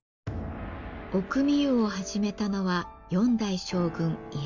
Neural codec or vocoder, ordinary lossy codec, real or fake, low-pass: none; none; real; 7.2 kHz